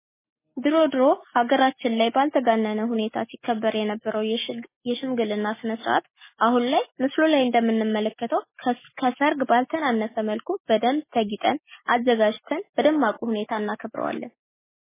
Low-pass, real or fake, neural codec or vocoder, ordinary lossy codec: 3.6 kHz; real; none; MP3, 16 kbps